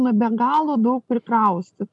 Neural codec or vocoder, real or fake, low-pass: none; real; 10.8 kHz